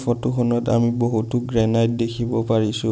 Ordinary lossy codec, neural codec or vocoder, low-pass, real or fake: none; none; none; real